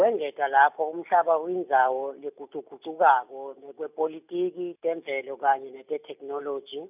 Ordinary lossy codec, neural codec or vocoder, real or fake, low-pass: none; none; real; 3.6 kHz